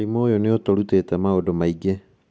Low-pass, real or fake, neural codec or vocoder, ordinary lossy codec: none; real; none; none